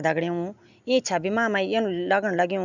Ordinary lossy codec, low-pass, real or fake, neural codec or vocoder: none; 7.2 kHz; real; none